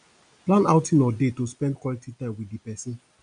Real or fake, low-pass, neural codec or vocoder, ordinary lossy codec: real; 9.9 kHz; none; none